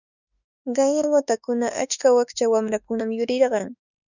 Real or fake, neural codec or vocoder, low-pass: fake; autoencoder, 48 kHz, 32 numbers a frame, DAC-VAE, trained on Japanese speech; 7.2 kHz